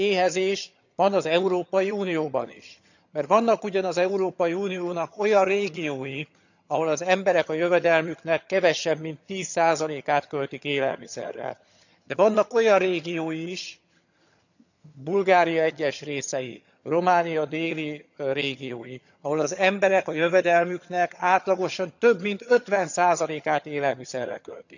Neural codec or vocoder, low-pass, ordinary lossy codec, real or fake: vocoder, 22.05 kHz, 80 mel bands, HiFi-GAN; 7.2 kHz; none; fake